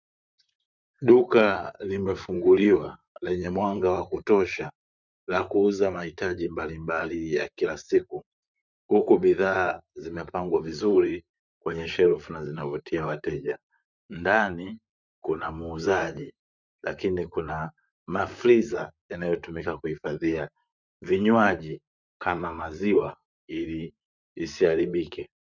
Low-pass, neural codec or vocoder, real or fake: 7.2 kHz; vocoder, 44.1 kHz, 128 mel bands, Pupu-Vocoder; fake